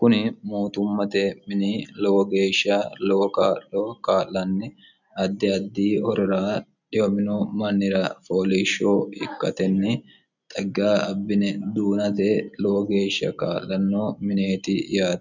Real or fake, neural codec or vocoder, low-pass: real; none; 7.2 kHz